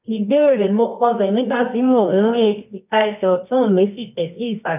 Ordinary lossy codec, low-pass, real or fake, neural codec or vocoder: none; 3.6 kHz; fake; codec, 24 kHz, 0.9 kbps, WavTokenizer, medium music audio release